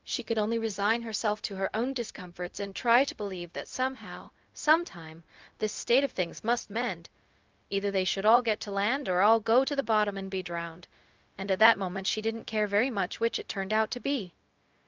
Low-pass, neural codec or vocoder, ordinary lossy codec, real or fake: 7.2 kHz; codec, 16 kHz, 0.4 kbps, LongCat-Audio-Codec; Opus, 24 kbps; fake